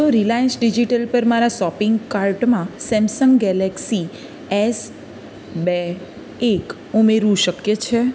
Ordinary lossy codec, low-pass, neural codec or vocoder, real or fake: none; none; none; real